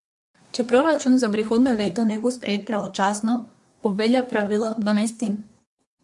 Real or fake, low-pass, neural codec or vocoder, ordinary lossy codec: fake; 10.8 kHz; codec, 24 kHz, 1 kbps, SNAC; MP3, 64 kbps